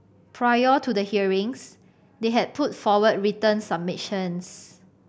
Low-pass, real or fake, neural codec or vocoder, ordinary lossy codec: none; real; none; none